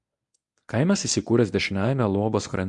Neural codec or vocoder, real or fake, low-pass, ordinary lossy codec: codec, 24 kHz, 0.9 kbps, WavTokenizer, medium speech release version 1; fake; 10.8 kHz; MP3, 64 kbps